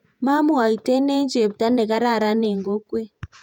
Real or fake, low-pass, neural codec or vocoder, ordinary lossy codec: fake; 19.8 kHz; vocoder, 48 kHz, 128 mel bands, Vocos; none